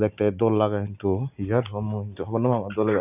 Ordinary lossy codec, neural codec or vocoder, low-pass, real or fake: none; none; 3.6 kHz; real